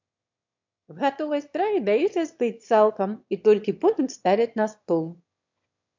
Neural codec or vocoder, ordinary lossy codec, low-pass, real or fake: autoencoder, 22.05 kHz, a latent of 192 numbers a frame, VITS, trained on one speaker; MP3, 64 kbps; 7.2 kHz; fake